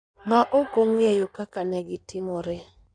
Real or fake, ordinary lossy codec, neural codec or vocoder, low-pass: fake; none; codec, 16 kHz in and 24 kHz out, 1.1 kbps, FireRedTTS-2 codec; 9.9 kHz